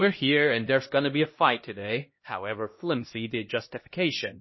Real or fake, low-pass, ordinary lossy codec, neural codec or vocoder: fake; 7.2 kHz; MP3, 24 kbps; codec, 16 kHz, 1 kbps, X-Codec, HuBERT features, trained on LibriSpeech